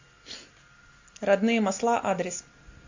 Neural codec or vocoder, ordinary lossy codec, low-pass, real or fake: none; AAC, 48 kbps; 7.2 kHz; real